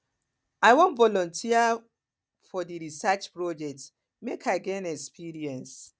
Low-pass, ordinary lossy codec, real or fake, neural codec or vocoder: none; none; real; none